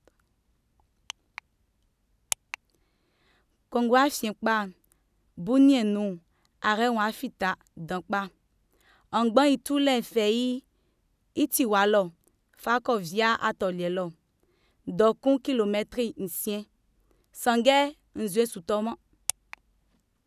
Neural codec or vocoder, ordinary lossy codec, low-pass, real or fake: none; none; 14.4 kHz; real